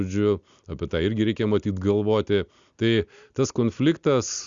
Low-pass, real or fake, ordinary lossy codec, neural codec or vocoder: 7.2 kHz; real; Opus, 64 kbps; none